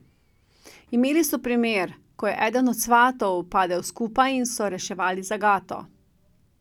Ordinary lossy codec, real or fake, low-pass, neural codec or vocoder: none; real; 19.8 kHz; none